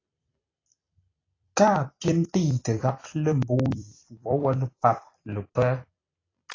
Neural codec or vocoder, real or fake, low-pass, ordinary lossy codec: vocoder, 44.1 kHz, 128 mel bands every 256 samples, BigVGAN v2; fake; 7.2 kHz; AAC, 32 kbps